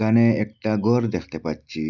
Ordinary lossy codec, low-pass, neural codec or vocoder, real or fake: none; 7.2 kHz; none; real